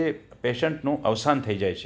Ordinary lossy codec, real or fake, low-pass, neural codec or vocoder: none; real; none; none